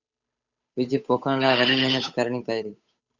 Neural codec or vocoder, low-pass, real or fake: codec, 16 kHz, 8 kbps, FunCodec, trained on Chinese and English, 25 frames a second; 7.2 kHz; fake